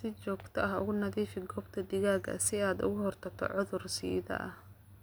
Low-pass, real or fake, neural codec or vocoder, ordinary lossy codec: none; real; none; none